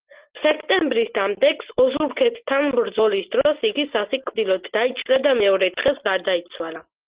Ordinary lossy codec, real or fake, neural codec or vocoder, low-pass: Opus, 16 kbps; real; none; 3.6 kHz